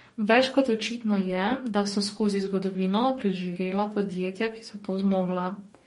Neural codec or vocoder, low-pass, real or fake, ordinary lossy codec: codec, 44.1 kHz, 2.6 kbps, DAC; 19.8 kHz; fake; MP3, 48 kbps